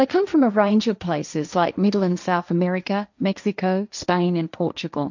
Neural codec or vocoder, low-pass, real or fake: codec, 16 kHz, 1.1 kbps, Voila-Tokenizer; 7.2 kHz; fake